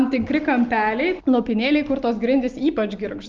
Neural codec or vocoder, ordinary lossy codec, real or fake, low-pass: none; Opus, 24 kbps; real; 7.2 kHz